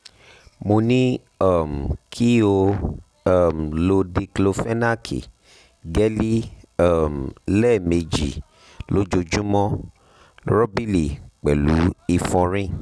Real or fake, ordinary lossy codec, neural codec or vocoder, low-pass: real; none; none; none